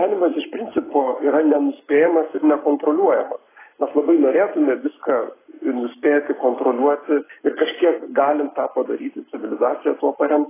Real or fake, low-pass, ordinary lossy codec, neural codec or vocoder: fake; 3.6 kHz; AAC, 16 kbps; codec, 44.1 kHz, 7.8 kbps, Pupu-Codec